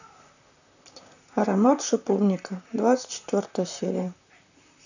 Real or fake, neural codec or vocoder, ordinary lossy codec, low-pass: fake; vocoder, 44.1 kHz, 128 mel bands, Pupu-Vocoder; none; 7.2 kHz